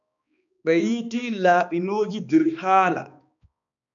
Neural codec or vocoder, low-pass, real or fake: codec, 16 kHz, 2 kbps, X-Codec, HuBERT features, trained on balanced general audio; 7.2 kHz; fake